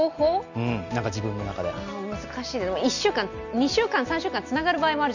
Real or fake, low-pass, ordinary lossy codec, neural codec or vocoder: real; 7.2 kHz; none; none